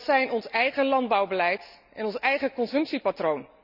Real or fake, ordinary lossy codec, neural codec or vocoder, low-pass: real; none; none; 5.4 kHz